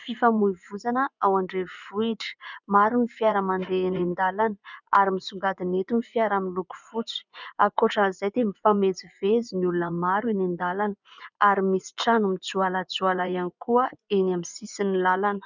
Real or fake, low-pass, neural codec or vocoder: fake; 7.2 kHz; vocoder, 24 kHz, 100 mel bands, Vocos